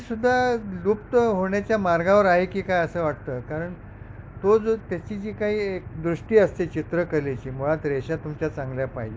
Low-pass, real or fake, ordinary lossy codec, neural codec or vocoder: none; real; none; none